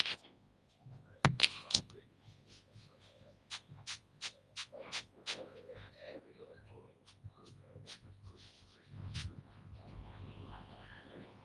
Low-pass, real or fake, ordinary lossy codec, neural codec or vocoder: 10.8 kHz; fake; Opus, 32 kbps; codec, 24 kHz, 0.9 kbps, WavTokenizer, large speech release